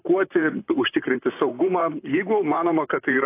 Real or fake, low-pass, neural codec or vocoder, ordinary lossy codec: fake; 3.6 kHz; vocoder, 44.1 kHz, 128 mel bands, Pupu-Vocoder; AAC, 24 kbps